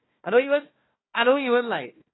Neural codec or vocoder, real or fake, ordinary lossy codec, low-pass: codec, 16 kHz, 1 kbps, FunCodec, trained on Chinese and English, 50 frames a second; fake; AAC, 16 kbps; 7.2 kHz